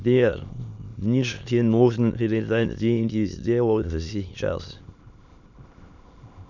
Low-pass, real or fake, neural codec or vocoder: 7.2 kHz; fake; autoencoder, 22.05 kHz, a latent of 192 numbers a frame, VITS, trained on many speakers